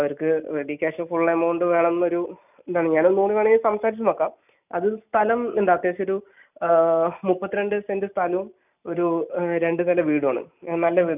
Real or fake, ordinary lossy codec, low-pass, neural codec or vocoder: real; none; 3.6 kHz; none